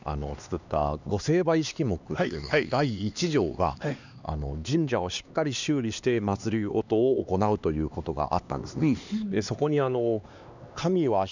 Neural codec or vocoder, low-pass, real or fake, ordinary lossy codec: codec, 16 kHz, 2 kbps, X-Codec, HuBERT features, trained on LibriSpeech; 7.2 kHz; fake; none